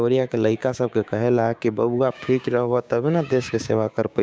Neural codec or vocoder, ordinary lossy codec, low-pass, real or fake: codec, 16 kHz, 6 kbps, DAC; none; none; fake